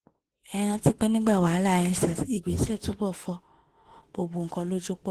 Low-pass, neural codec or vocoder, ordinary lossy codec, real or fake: 14.4 kHz; autoencoder, 48 kHz, 32 numbers a frame, DAC-VAE, trained on Japanese speech; Opus, 16 kbps; fake